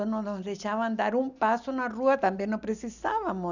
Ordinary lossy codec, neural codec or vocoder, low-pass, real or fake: none; none; 7.2 kHz; real